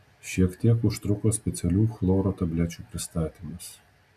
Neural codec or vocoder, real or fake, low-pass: none; real; 14.4 kHz